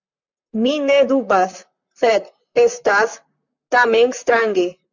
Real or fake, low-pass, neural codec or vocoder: fake; 7.2 kHz; vocoder, 44.1 kHz, 128 mel bands, Pupu-Vocoder